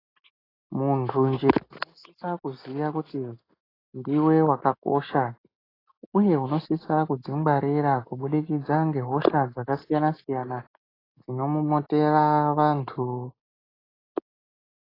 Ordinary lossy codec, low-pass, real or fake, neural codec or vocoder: AAC, 24 kbps; 5.4 kHz; real; none